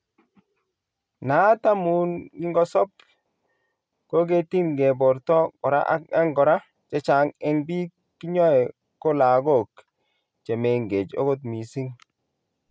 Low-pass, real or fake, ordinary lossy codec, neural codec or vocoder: none; real; none; none